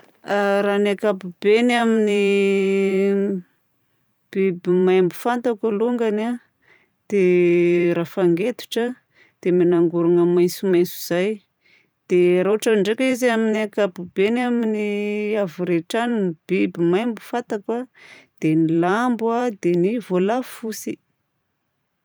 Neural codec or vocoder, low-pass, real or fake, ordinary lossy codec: vocoder, 44.1 kHz, 128 mel bands every 512 samples, BigVGAN v2; none; fake; none